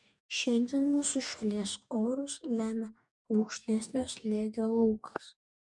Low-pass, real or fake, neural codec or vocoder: 10.8 kHz; fake; codec, 44.1 kHz, 2.6 kbps, DAC